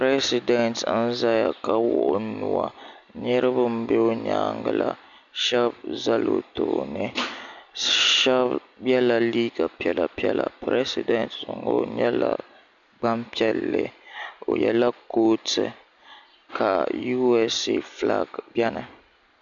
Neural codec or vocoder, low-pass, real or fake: none; 7.2 kHz; real